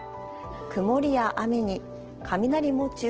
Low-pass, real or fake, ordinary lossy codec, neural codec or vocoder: 7.2 kHz; real; Opus, 16 kbps; none